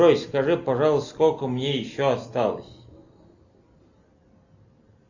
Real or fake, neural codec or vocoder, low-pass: real; none; 7.2 kHz